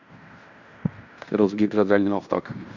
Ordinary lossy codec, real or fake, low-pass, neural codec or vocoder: none; fake; 7.2 kHz; codec, 16 kHz in and 24 kHz out, 0.9 kbps, LongCat-Audio-Codec, fine tuned four codebook decoder